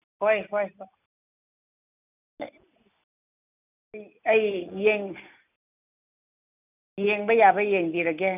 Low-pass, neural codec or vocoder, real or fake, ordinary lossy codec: 3.6 kHz; none; real; none